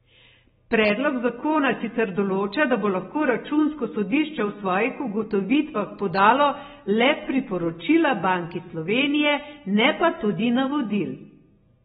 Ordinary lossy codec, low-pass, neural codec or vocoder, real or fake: AAC, 16 kbps; 7.2 kHz; none; real